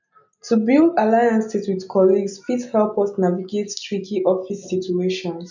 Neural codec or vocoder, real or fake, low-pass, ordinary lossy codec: none; real; 7.2 kHz; none